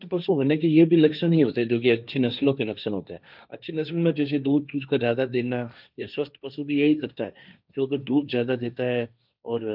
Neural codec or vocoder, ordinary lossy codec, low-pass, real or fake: codec, 16 kHz, 1.1 kbps, Voila-Tokenizer; none; 5.4 kHz; fake